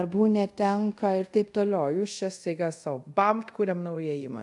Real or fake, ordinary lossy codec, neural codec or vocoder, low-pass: fake; AAC, 64 kbps; codec, 24 kHz, 0.5 kbps, DualCodec; 10.8 kHz